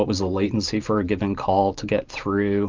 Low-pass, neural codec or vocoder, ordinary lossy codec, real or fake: 7.2 kHz; vocoder, 44.1 kHz, 128 mel bands every 512 samples, BigVGAN v2; Opus, 24 kbps; fake